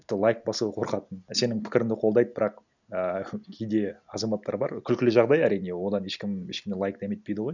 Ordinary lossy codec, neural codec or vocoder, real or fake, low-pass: none; none; real; 7.2 kHz